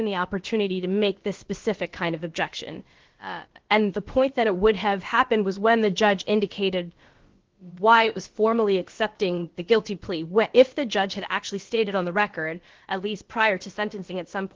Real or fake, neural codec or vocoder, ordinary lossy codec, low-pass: fake; codec, 16 kHz, about 1 kbps, DyCAST, with the encoder's durations; Opus, 16 kbps; 7.2 kHz